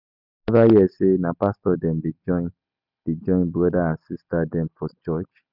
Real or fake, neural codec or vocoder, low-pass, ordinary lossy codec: real; none; 5.4 kHz; none